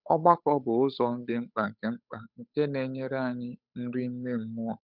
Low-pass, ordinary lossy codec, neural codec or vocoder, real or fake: 5.4 kHz; none; codec, 16 kHz, 8 kbps, FunCodec, trained on Chinese and English, 25 frames a second; fake